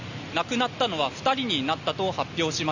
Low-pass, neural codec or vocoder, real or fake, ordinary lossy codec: 7.2 kHz; none; real; none